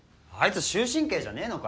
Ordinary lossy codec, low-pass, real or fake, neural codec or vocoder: none; none; real; none